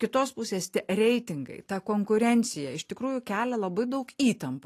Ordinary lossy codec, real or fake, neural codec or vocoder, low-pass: AAC, 48 kbps; real; none; 14.4 kHz